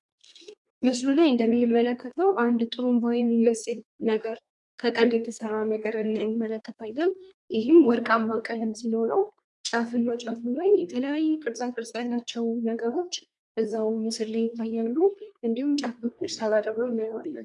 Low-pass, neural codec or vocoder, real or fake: 10.8 kHz; codec, 24 kHz, 1 kbps, SNAC; fake